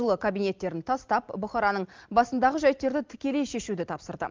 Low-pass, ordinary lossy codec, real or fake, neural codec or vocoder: 7.2 kHz; Opus, 32 kbps; real; none